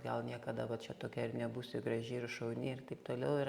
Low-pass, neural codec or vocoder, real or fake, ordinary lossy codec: 19.8 kHz; none; real; Opus, 64 kbps